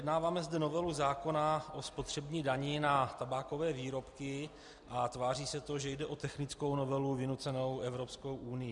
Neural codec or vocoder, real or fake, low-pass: none; real; 10.8 kHz